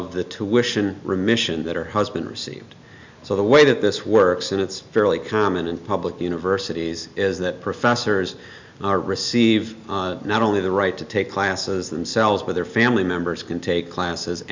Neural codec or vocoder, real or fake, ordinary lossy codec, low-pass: none; real; MP3, 64 kbps; 7.2 kHz